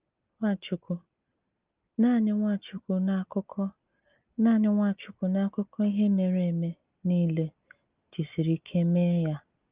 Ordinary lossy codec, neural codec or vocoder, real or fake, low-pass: Opus, 32 kbps; none; real; 3.6 kHz